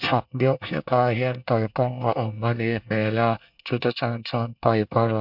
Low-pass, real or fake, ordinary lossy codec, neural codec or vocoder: 5.4 kHz; fake; MP3, 48 kbps; codec, 24 kHz, 1 kbps, SNAC